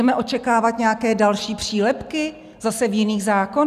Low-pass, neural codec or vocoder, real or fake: 14.4 kHz; none; real